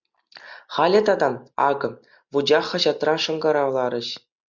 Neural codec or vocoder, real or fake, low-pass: none; real; 7.2 kHz